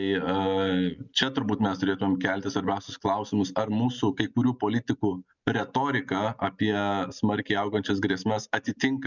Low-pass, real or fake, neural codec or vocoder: 7.2 kHz; real; none